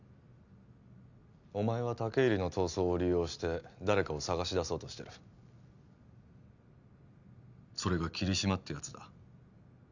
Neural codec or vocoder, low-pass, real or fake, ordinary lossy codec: none; 7.2 kHz; real; none